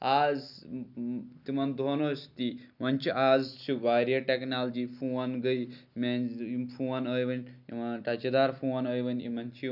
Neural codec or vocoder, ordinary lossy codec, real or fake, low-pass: none; none; real; 5.4 kHz